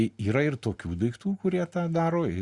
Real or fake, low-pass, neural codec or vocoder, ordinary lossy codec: real; 10.8 kHz; none; Opus, 64 kbps